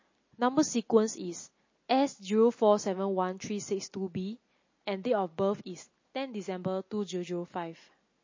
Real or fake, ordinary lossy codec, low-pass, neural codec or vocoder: real; MP3, 32 kbps; 7.2 kHz; none